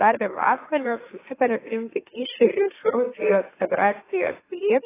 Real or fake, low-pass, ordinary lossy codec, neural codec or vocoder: fake; 3.6 kHz; AAC, 16 kbps; autoencoder, 44.1 kHz, a latent of 192 numbers a frame, MeloTTS